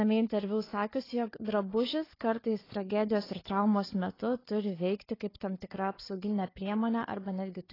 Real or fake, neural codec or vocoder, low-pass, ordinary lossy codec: fake; codec, 16 kHz, 4 kbps, FunCodec, trained on Chinese and English, 50 frames a second; 5.4 kHz; AAC, 24 kbps